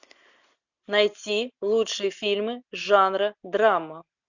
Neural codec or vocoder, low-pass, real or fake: none; 7.2 kHz; real